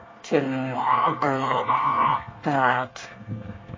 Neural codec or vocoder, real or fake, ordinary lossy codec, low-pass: codec, 24 kHz, 1 kbps, SNAC; fake; MP3, 32 kbps; 7.2 kHz